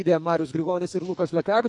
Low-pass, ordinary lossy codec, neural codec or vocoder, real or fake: 10.8 kHz; AAC, 64 kbps; codec, 44.1 kHz, 2.6 kbps, SNAC; fake